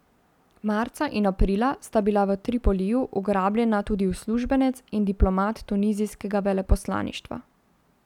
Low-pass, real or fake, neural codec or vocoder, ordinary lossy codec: 19.8 kHz; real; none; none